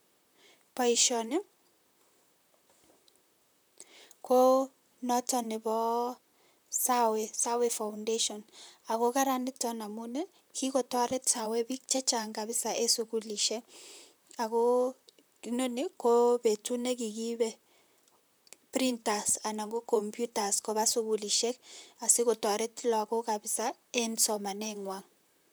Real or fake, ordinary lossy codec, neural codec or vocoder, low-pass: fake; none; vocoder, 44.1 kHz, 128 mel bands, Pupu-Vocoder; none